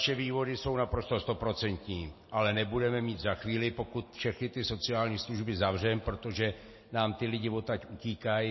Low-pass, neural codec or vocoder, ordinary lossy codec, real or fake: 7.2 kHz; none; MP3, 24 kbps; real